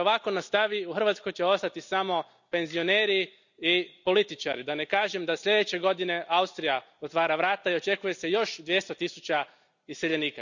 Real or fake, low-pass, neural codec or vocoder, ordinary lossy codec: real; 7.2 kHz; none; none